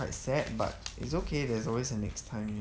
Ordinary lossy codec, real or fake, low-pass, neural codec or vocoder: none; real; none; none